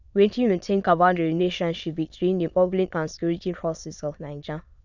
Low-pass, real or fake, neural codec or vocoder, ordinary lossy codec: 7.2 kHz; fake; autoencoder, 22.05 kHz, a latent of 192 numbers a frame, VITS, trained on many speakers; none